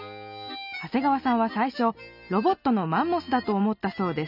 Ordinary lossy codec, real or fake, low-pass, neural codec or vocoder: none; real; 5.4 kHz; none